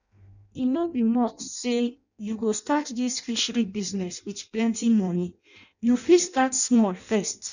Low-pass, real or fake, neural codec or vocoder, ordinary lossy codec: 7.2 kHz; fake; codec, 16 kHz in and 24 kHz out, 0.6 kbps, FireRedTTS-2 codec; none